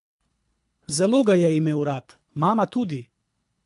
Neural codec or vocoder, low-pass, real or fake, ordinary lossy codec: codec, 24 kHz, 3 kbps, HILCodec; 10.8 kHz; fake; MP3, 64 kbps